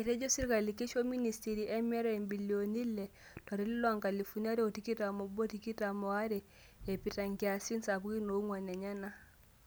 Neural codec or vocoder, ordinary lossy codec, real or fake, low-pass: none; none; real; none